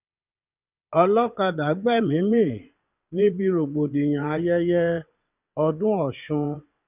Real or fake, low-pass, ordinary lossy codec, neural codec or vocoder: fake; 3.6 kHz; none; vocoder, 22.05 kHz, 80 mel bands, WaveNeXt